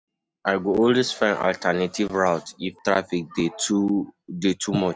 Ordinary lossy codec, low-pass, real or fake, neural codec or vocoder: none; none; real; none